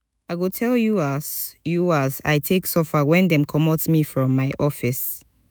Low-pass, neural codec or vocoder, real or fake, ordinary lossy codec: none; autoencoder, 48 kHz, 128 numbers a frame, DAC-VAE, trained on Japanese speech; fake; none